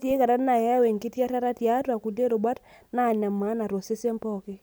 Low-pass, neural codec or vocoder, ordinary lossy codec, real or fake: none; none; none; real